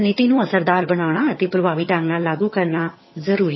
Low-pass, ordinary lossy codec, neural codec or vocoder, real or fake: 7.2 kHz; MP3, 24 kbps; vocoder, 22.05 kHz, 80 mel bands, HiFi-GAN; fake